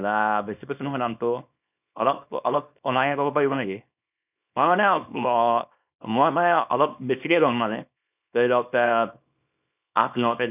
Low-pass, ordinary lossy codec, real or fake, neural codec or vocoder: 3.6 kHz; none; fake; codec, 24 kHz, 0.9 kbps, WavTokenizer, small release